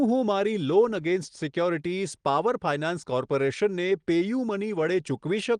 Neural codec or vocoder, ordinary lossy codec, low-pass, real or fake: none; Opus, 24 kbps; 9.9 kHz; real